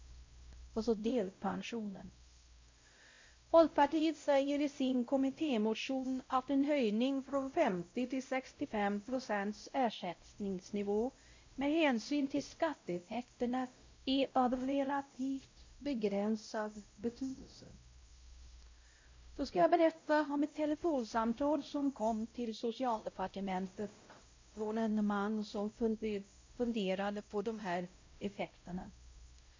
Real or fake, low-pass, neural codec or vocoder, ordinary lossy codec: fake; 7.2 kHz; codec, 16 kHz, 0.5 kbps, X-Codec, WavLM features, trained on Multilingual LibriSpeech; MP3, 48 kbps